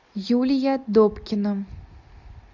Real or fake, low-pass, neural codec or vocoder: real; 7.2 kHz; none